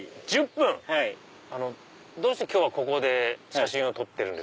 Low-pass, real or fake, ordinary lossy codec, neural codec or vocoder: none; real; none; none